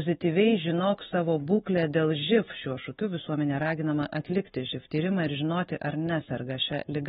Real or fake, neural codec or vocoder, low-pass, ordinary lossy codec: fake; autoencoder, 48 kHz, 128 numbers a frame, DAC-VAE, trained on Japanese speech; 19.8 kHz; AAC, 16 kbps